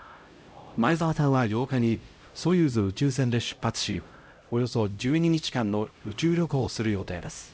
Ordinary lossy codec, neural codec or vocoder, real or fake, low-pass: none; codec, 16 kHz, 0.5 kbps, X-Codec, HuBERT features, trained on LibriSpeech; fake; none